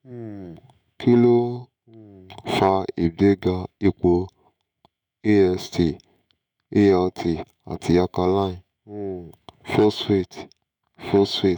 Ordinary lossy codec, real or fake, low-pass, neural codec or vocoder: none; fake; 19.8 kHz; autoencoder, 48 kHz, 128 numbers a frame, DAC-VAE, trained on Japanese speech